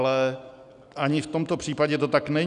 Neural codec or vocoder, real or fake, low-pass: none; real; 10.8 kHz